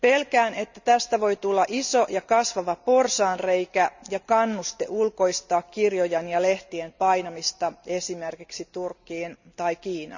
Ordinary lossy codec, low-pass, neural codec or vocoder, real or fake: none; 7.2 kHz; none; real